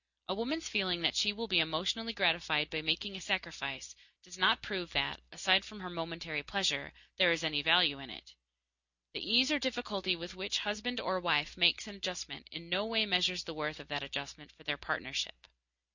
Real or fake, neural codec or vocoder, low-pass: real; none; 7.2 kHz